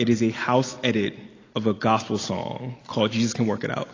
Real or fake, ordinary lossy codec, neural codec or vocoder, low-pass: real; AAC, 32 kbps; none; 7.2 kHz